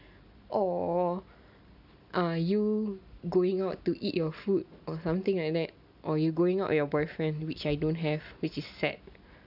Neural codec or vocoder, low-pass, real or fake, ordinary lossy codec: none; 5.4 kHz; real; none